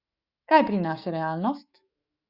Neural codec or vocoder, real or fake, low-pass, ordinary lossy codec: autoencoder, 48 kHz, 128 numbers a frame, DAC-VAE, trained on Japanese speech; fake; 5.4 kHz; Opus, 64 kbps